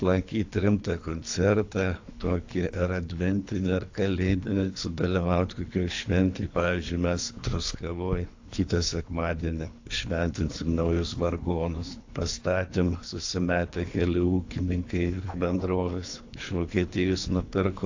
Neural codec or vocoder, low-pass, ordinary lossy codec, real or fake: codec, 24 kHz, 3 kbps, HILCodec; 7.2 kHz; AAC, 48 kbps; fake